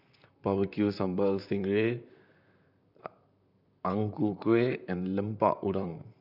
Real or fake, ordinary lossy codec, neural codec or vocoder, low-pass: fake; none; vocoder, 44.1 kHz, 128 mel bands, Pupu-Vocoder; 5.4 kHz